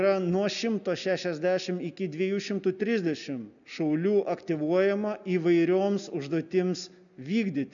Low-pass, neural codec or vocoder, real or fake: 7.2 kHz; none; real